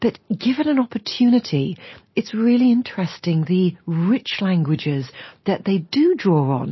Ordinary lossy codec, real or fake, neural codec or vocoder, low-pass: MP3, 24 kbps; real; none; 7.2 kHz